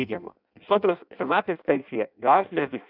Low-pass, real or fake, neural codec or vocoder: 5.4 kHz; fake; codec, 16 kHz in and 24 kHz out, 0.6 kbps, FireRedTTS-2 codec